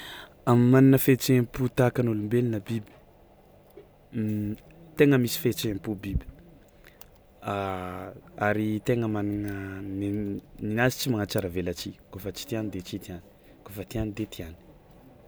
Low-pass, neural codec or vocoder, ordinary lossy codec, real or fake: none; none; none; real